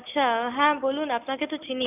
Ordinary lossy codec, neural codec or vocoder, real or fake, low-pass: none; none; real; 3.6 kHz